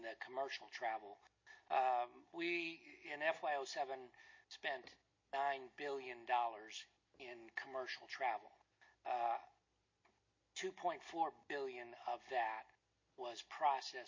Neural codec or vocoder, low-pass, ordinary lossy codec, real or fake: none; 7.2 kHz; MP3, 32 kbps; real